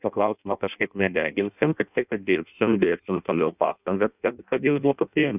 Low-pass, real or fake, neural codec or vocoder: 3.6 kHz; fake; codec, 16 kHz in and 24 kHz out, 0.6 kbps, FireRedTTS-2 codec